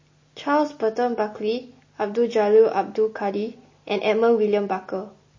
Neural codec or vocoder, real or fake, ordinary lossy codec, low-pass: none; real; MP3, 32 kbps; 7.2 kHz